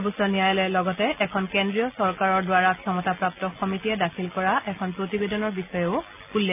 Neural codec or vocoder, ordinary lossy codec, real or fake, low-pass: none; none; real; 3.6 kHz